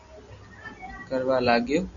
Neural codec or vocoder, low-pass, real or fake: none; 7.2 kHz; real